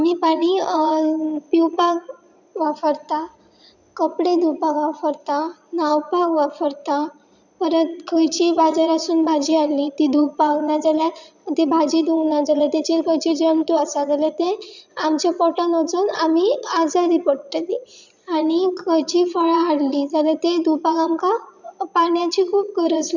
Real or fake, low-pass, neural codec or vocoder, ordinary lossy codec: fake; 7.2 kHz; vocoder, 22.05 kHz, 80 mel bands, Vocos; none